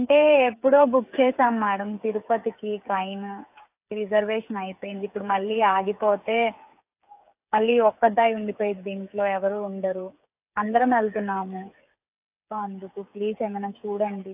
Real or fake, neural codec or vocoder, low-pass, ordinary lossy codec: fake; codec, 16 kHz, 8 kbps, FreqCodec, smaller model; 3.6 kHz; AAC, 32 kbps